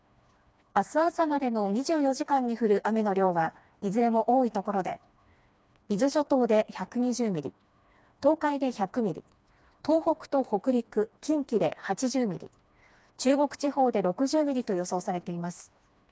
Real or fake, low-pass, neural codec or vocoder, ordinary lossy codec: fake; none; codec, 16 kHz, 2 kbps, FreqCodec, smaller model; none